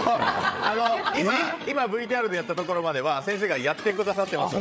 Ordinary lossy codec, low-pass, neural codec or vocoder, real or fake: none; none; codec, 16 kHz, 8 kbps, FreqCodec, larger model; fake